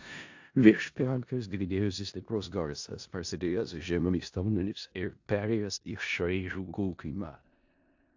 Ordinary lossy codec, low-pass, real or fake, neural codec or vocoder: AAC, 48 kbps; 7.2 kHz; fake; codec, 16 kHz in and 24 kHz out, 0.4 kbps, LongCat-Audio-Codec, four codebook decoder